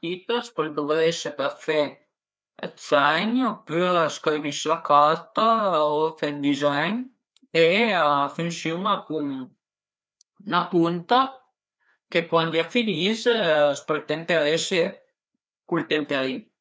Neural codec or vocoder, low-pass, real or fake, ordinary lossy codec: codec, 16 kHz, 2 kbps, FreqCodec, larger model; none; fake; none